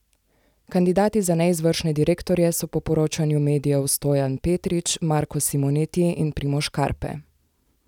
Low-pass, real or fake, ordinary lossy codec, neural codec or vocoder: 19.8 kHz; real; none; none